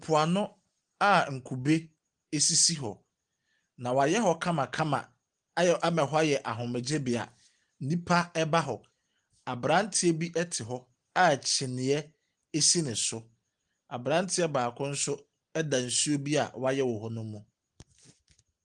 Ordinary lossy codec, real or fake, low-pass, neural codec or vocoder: Opus, 24 kbps; real; 9.9 kHz; none